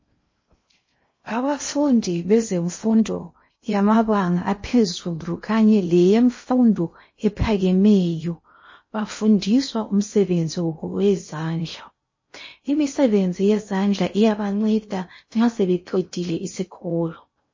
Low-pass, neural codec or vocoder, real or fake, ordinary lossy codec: 7.2 kHz; codec, 16 kHz in and 24 kHz out, 0.6 kbps, FocalCodec, streaming, 2048 codes; fake; MP3, 32 kbps